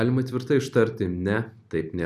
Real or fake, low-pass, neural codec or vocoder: real; 14.4 kHz; none